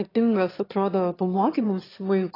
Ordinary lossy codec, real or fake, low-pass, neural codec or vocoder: AAC, 24 kbps; fake; 5.4 kHz; autoencoder, 22.05 kHz, a latent of 192 numbers a frame, VITS, trained on one speaker